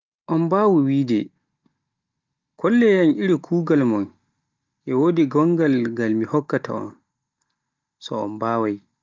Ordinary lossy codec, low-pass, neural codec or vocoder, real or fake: Opus, 32 kbps; 7.2 kHz; none; real